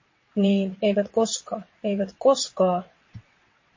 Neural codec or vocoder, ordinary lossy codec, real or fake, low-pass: vocoder, 22.05 kHz, 80 mel bands, WaveNeXt; MP3, 32 kbps; fake; 7.2 kHz